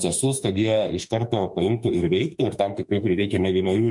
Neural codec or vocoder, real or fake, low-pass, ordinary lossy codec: autoencoder, 48 kHz, 32 numbers a frame, DAC-VAE, trained on Japanese speech; fake; 10.8 kHz; MP3, 64 kbps